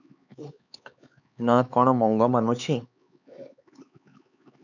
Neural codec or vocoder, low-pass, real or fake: codec, 16 kHz, 4 kbps, X-Codec, HuBERT features, trained on LibriSpeech; 7.2 kHz; fake